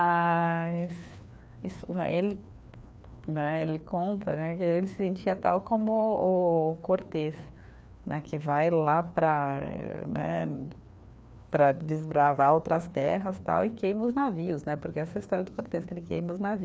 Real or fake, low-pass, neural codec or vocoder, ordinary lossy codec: fake; none; codec, 16 kHz, 2 kbps, FreqCodec, larger model; none